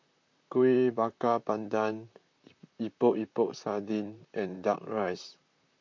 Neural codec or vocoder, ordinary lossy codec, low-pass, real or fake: vocoder, 44.1 kHz, 128 mel bands, Pupu-Vocoder; MP3, 48 kbps; 7.2 kHz; fake